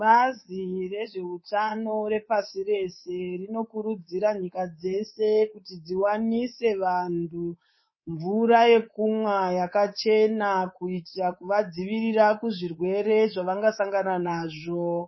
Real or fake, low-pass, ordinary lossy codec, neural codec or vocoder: fake; 7.2 kHz; MP3, 24 kbps; codec, 24 kHz, 3.1 kbps, DualCodec